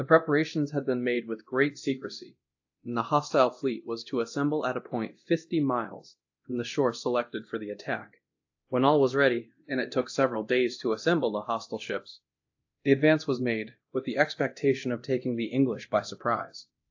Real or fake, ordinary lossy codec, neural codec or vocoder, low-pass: fake; AAC, 48 kbps; codec, 24 kHz, 0.9 kbps, DualCodec; 7.2 kHz